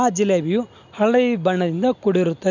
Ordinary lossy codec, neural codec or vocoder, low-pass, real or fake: none; none; 7.2 kHz; real